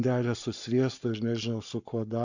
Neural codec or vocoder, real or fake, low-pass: codec, 44.1 kHz, 7.8 kbps, Pupu-Codec; fake; 7.2 kHz